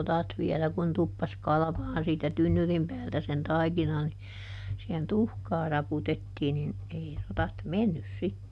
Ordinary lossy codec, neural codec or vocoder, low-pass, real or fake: none; none; none; real